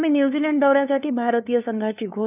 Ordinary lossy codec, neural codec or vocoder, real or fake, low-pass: none; codec, 16 kHz, 2 kbps, FunCodec, trained on LibriTTS, 25 frames a second; fake; 3.6 kHz